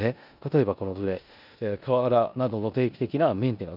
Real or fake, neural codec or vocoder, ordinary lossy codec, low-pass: fake; codec, 16 kHz in and 24 kHz out, 0.9 kbps, LongCat-Audio-Codec, four codebook decoder; none; 5.4 kHz